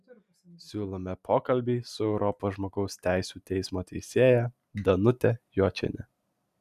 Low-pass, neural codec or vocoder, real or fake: 14.4 kHz; none; real